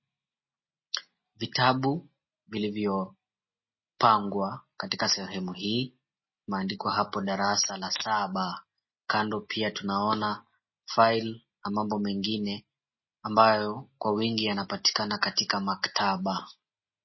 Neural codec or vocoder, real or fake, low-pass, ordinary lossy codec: none; real; 7.2 kHz; MP3, 24 kbps